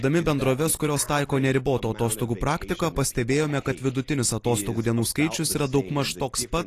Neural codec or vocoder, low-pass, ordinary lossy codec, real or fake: none; 14.4 kHz; AAC, 48 kbps; real